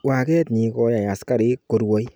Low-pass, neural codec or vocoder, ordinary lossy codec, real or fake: none; none; none; real